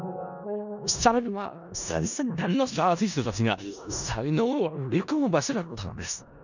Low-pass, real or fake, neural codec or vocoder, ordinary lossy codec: 7.2 kHz; fake; codec, 16 kHz in and 24 kHz out, 0.4 kbps, LongCat-Audio-Codec, four codebook decoder; none